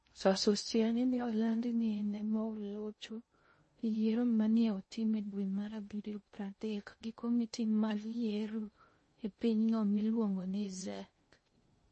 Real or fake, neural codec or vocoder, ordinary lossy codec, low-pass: fake; codec, 16 kHz in and 24 kHz out, 0.6 kbps, FocalCodec, streaming, 2048 codes; MP3, 32 kbps; 10.8 kHz